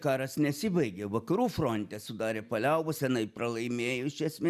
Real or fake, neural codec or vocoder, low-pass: real; none; 14.4 kHz